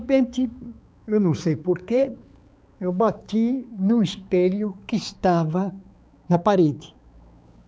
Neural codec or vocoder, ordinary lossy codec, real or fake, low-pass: codec, 16 kHz, 4 kbps, X-Codec, HuBERT features, trained on balanced general audio; none; fake; none